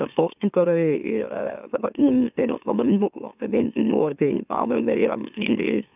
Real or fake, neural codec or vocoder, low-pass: fake; autoencoder, 44.1 kHz, a latent of 192 numbers a frame, MeloTTS; 3.6 kHz